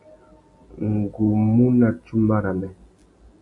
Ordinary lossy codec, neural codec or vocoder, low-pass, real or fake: AAC, 48 kbps; none; 10.8 kHz; real